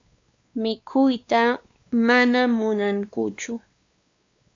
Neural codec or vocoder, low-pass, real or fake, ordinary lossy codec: codec, 16 kHz, 2 kbps, X-Codec, WavLM features, trained on Multilingual LibriSpeech; 7.2 kHz; fake; AAC, 64 kbps